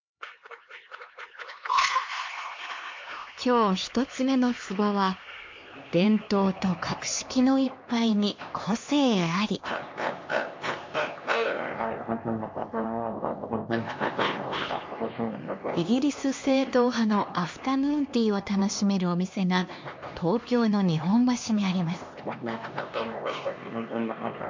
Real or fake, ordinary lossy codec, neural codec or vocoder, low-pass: fake; MP3, 48 kbps; codec, 16 kHz, 2 kbps, X-Codec, HuBERT features, trained on LibriSpeech; 7.2 kHz